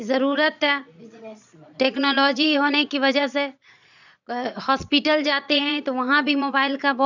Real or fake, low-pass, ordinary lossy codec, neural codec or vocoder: fake; 7.2 kHz; none; vocoder, 22.05 kHz, 80 mel bands, Vocos